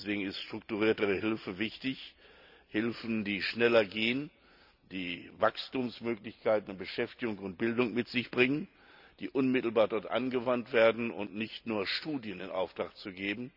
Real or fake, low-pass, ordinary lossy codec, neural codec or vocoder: real; 5.4 kHz; none; none